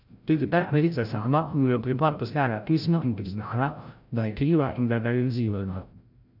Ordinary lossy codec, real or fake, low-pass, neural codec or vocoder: none; fake; 5.4 kHz; codec, 16 kHz, 0.5 kbps, FreqCodec, larger model